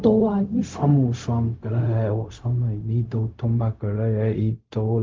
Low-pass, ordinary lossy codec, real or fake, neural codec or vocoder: 7.2 kHz; Opus, 24 kbps; fake; codec, 16 kHz, 0.4 kbps, LongCat-Audio-Codec